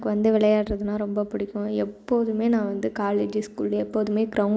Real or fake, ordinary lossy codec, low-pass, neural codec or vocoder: real; none; none; none